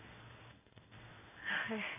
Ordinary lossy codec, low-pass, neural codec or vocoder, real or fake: none; 3.6 kHz; codec, 16 kHz in and 24 kHz out, 1 kbps, XY-Tokenizer; fake